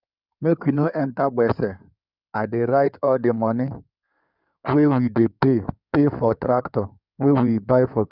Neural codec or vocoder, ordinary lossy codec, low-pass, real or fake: codec, 16 kHz in and 24 kHz out, 2.2 kbps, FireRedTTS-2 codec; none; 5.4 kHz; fake